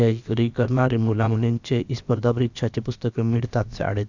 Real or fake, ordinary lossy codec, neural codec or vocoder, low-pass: fake; none; codec, 16 kHz, about 1 kbps, DyCAST, with the encoder's durations; 7.2 kHz